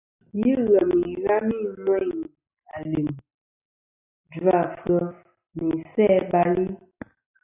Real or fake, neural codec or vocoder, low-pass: real; none; 3.6 kHz